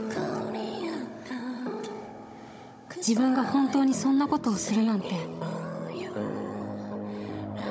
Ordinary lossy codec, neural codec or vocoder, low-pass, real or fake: none; codec, 16 kHz, 16 kbps, FunCodec, trained on Chinese and English, 50 frames a second; none; fake